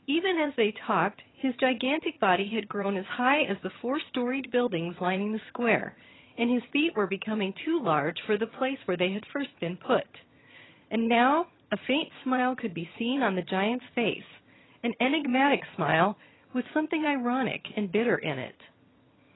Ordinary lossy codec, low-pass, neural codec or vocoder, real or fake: AAC, 16 kbps; 7.2 kHz; vocoder, 22.05 kHz, 80 mel bands, HiFi-GAN; fake